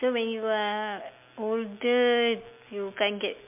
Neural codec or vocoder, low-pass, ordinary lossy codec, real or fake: none; 3.6 kHz; MP3, 32 kbps; real